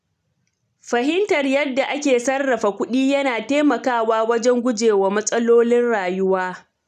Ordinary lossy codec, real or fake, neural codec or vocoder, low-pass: none; real; none; 14.4 kHz